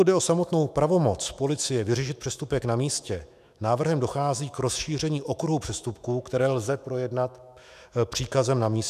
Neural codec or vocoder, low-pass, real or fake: autoencoder, 48 kHz, 128 numbers a frame, DAC-VAE, trained on Japanese speech; 14.4 kHz; fake